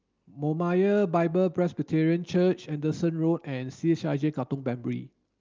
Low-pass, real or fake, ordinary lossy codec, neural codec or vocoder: 7.2 kHz; real; Opus, 32 kbps; none